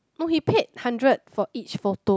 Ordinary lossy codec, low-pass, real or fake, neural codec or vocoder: none; none; real; none